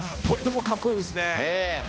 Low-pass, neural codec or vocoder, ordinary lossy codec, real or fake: none; codec, 16 kHz, 1 kbps, X-Codec, HuBERT features, trained on balanced general audio; none; fake